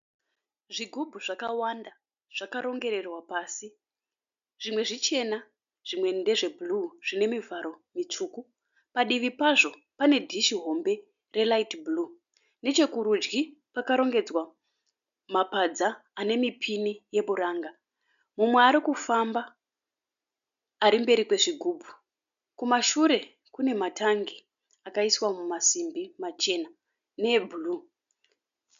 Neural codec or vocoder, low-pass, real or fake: none; 7.2 kHz; real